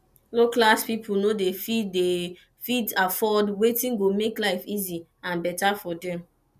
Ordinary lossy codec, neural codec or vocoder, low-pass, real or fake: none; none; 14.4 kHz; real